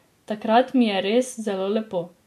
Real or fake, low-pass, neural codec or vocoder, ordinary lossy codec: real; 14.4 kHz; none; MP3, 64 kbps